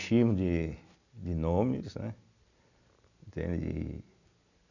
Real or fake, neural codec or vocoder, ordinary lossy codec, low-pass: real; none; none; 7.2 kHz